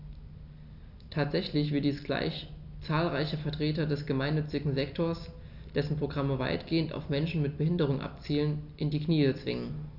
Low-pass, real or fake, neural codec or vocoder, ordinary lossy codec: 5.4 kHz; real; none; none